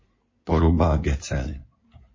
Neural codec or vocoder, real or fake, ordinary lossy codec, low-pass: codec, 16 kHz in and 24 kHz out, 2.2 kbps, FireRedTTS-2 codec; fake; MP3, 32 kbps; 7.2 kHz